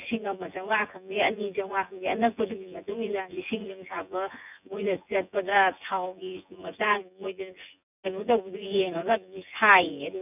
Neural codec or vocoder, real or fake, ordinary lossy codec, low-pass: vocoder, 24 kHz, 100 mel bands, Vocos; fake; none; 3.6 kHz